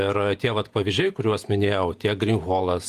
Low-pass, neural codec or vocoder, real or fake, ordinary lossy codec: 14.4 kHz; vocoder, 48 kHz, 128 mel bands, Vocos; fake; Opus, 32 kbps